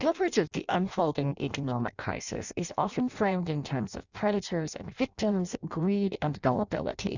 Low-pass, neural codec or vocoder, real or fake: 7.2 kHz; codec, 16 kHz in and 24 kHz out, 0.6 kbps, FireRedTTS-2 codec; fake